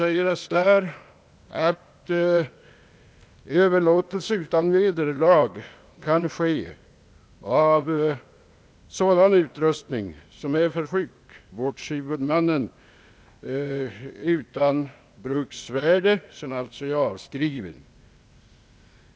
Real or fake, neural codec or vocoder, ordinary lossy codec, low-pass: fake; codec, 16 kHz, 0.8 kbps, ZipCodec; none; none